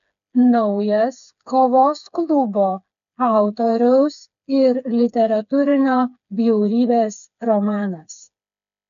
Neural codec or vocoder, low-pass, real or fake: codec, 16 kHz, 4 kbps, FreqCodec, smaller model; 7.2 kHz; fake